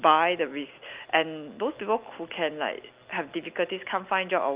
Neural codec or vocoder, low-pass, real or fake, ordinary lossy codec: none; 3.6 kHz; real; Opus, 24 kbps